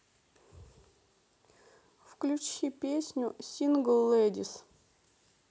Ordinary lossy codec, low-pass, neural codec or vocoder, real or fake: none; none; none; real